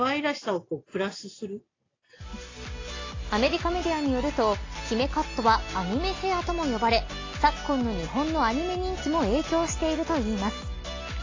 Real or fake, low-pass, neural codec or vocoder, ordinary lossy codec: real; 7.2 kHz; none; AAC, 32 kbps